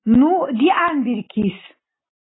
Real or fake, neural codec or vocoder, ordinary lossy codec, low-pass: real; none; AAC, 16 kbps; 7.2 kHz